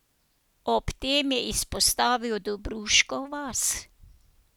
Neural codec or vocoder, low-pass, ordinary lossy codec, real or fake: none; none; none; real